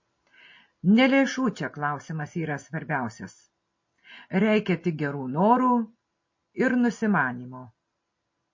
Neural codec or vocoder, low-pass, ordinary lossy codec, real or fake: none; 7.2 kHz; MP3, 32 kbps; real